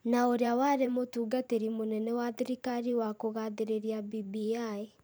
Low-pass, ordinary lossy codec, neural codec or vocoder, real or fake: none; none; vocoder, 44.1 kHz, 128 mel bands every 512 samples, BigVGAN v2; fake